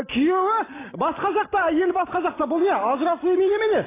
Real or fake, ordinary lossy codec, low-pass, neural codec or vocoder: real; AAC, 16 kbps; 3.6 kHz; none